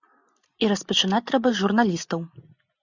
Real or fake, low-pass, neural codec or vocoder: real; 7.2 kHz; none